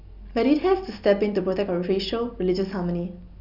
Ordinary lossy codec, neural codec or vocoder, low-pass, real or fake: none; none; 5.4 kHz; real